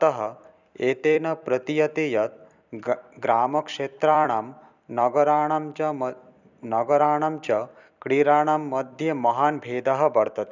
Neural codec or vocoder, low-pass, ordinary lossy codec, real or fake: vocoder, 44.1 kHz, 128 mel bands every 256 samples, BigVGAN v2; 7.2 kHz; none; fake